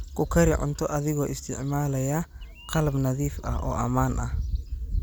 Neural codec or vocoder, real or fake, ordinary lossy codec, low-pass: none; real; none; none